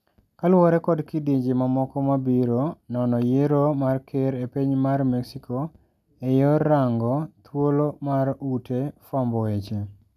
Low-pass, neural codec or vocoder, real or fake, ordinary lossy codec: 14.4 kHz; none; real; none